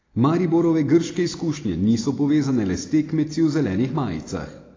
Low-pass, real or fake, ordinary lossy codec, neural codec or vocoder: 7.2 kHz; real; AAC, 32 kbps; none